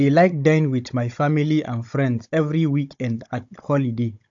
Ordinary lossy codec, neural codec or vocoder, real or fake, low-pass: AAC, 64 kbps; codec, 16 kHz, 16 kbps, FunCodec, trained on Chinese and English, 50 frames a second; fake; 7.2 kHz